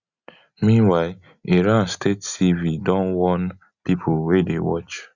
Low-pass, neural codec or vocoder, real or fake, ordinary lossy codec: 7.2 kHz; none; real; Opus, 64 kbps